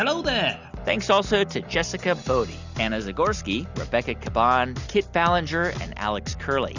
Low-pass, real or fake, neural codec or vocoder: 7.2 kHz; real; none